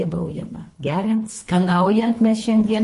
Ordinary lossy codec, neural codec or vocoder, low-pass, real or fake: MP3, 48 kbps; codec, 24 kHz, 3 kbps, HILCodec; 10.8 kHz; fake